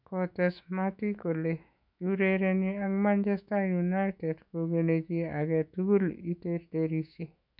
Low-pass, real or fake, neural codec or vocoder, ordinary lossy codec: 5.4 kHz; fake; autoencoder, 48 kHz, 128 numbers a frame, DAC-VAE, trained on Japanese speech; none